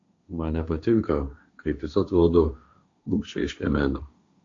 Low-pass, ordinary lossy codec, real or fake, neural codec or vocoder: 7.2 kHz; AAC, 48 kbps; fake; codec, 16 kHz, 1.1 kbps, Voila-Tokenizer